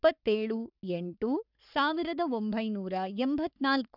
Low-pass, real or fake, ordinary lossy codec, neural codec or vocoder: 5.4 kHz; fake; none; codec, 44.1 kHz, 3.4 kbps, Pupu-Codec